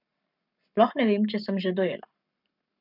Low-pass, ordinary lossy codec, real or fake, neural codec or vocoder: 5.4 kHz; none; fake; codec, 44.1 kHz, 7.8 kbps, Pupu-Codec